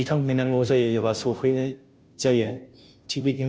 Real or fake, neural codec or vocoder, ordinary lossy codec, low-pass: fake; codec, 16 kHz, 0.5 kbps, FunCodec, trained on Chinese and English, 25 frames a second; none; none